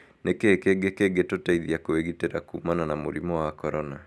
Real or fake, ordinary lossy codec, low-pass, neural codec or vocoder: real; none; none; none